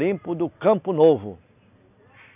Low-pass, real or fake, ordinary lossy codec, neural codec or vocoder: 3.6 kHz; real; none; none